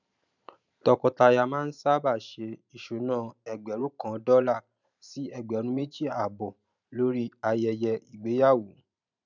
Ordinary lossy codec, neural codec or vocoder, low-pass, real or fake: none; vocoder, 44.1 kHz, 128 mel bands every 512 samples, BigVGAN v2; 7.2 kHz; fake